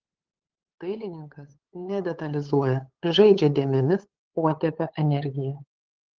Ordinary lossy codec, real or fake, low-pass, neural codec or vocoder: Opus, 16 kbps; fake; 7.2 kHz; codec, 16 kHz, 8 kbps, FunCodec, trained on LibriTTS, 25 frames a second